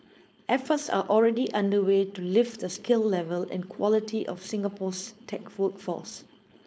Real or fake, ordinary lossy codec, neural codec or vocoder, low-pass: fake; none; codec, 16 kHz, 4.8 kbps, FACodec; none